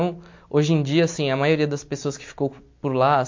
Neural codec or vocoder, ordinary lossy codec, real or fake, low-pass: none; MP3, 48 kbps; real; 7.2 kHz